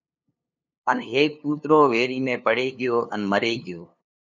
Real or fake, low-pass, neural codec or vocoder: fake; 7.2 kHz; codec, 16 kHz, 2 kbps, FunCodec, trained on LibriTTS, 25 frames a second